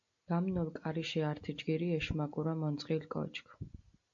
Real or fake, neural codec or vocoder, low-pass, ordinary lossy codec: real; none; 7.2 kHz; AAC, 64 kbps